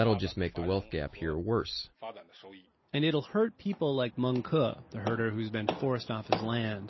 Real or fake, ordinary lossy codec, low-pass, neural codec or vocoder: real; MP3, 24 kbps; 7.2 kHz; none